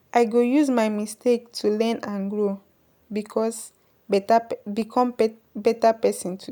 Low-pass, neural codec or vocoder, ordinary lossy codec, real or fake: none; none; none; real